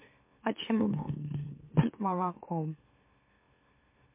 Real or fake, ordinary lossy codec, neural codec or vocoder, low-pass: fake; MP3, 24 kbps; autoencoder, 44.1 kHz, a latent of 192 numbers a frame, MeloTTS; 3.6 kHz